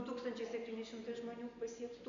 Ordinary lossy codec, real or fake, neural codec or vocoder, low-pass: AAC, 64 kbps; real; none; 7.2 kHz